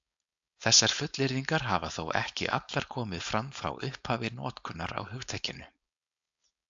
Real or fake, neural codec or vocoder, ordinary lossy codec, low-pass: fake; codec, 16 kHz, 4.8 kbps, FACodec; AAC, 64 kbps; 7.2 kHz